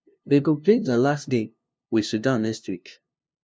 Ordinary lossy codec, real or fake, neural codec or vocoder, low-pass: none; fake; codec, 16 kHz, 0.5 kbps, FunCodec, trained on LibriTTS, 25 frames a second; none